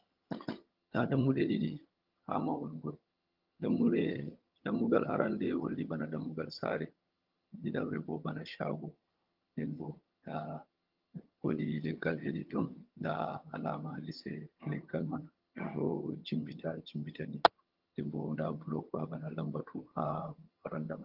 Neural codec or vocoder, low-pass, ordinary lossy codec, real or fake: vocoder, 22.05 kHz, 80 mel bands, HiFi-GAN; 5.4 kHz; Opus, 24 kbps; fake